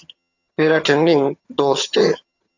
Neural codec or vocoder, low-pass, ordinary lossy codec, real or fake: vocoder, 22.05 kHz, 80 mel bands, HiFi-GAN; 7.2 kHz; AAC, 48 kbps; fake